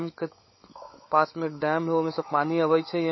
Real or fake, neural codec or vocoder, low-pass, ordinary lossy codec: fake; codec, 16 kHz, 16 kbps, FunCodec, trained on LibriTTS, 50 frames a second; 7.2 kHz; MP3, 24 kbps